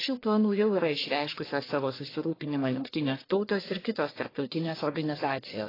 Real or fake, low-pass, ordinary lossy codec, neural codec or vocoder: fake; 5.4 kHz; AAC, 24 kbps; codec, 44.1 kHz, 1.7 kbps, Pupu-Codec